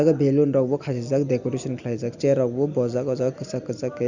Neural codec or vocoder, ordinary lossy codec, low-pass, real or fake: none; Opus, 64 kbps; 7.2 kHz; real